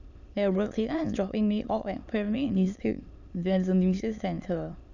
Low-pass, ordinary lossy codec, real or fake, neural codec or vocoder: 7.2 kHz; none; fake; autoencoder, 22.05 kHz, a latent of 192 numbers a frame, VITS, trained on many speakers